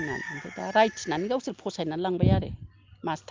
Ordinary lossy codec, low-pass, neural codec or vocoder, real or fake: Opus, 24 kbps; 7.2 kHz; none; real